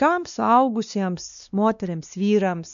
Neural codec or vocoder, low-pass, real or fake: codec, 16 kHz, 2 kbps, X-Codec, WavLM features, trained on Multilingual LibriSpeech; 7.2 kHz; fake